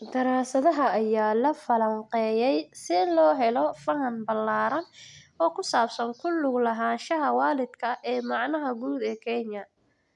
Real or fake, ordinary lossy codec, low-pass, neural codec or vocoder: real; MP3, 96 kbps; 10.8 kHz; none